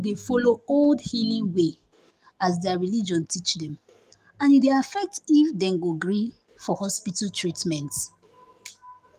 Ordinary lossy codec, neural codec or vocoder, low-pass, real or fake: Opus, 32 kbps; codec, 44.1 kHz, 7.8 kbps, DAC; 14.4 kHz; fake